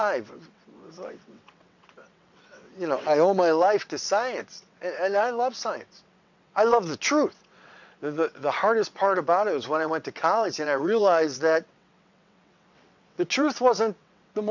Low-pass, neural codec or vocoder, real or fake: 7.2 kHz; vocoder, 22.05 kHz, 80 mel bands, WaveNeXt; fake